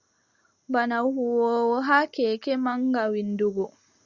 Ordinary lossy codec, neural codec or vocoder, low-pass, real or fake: Opus, 64 kbps; none; 7.2 kHz; real